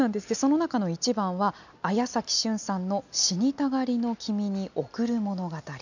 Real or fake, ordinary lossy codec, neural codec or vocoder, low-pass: real; none; none; 7.2 kHz